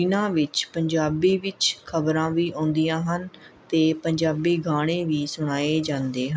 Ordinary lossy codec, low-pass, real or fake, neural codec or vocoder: none; none; real; none